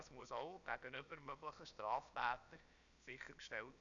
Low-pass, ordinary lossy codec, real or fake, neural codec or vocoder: 7.2 kHz; MP3, 96 kbps; fake; codec, 16 kHz, about 1 kbps, DyCAST, with the encoder's durations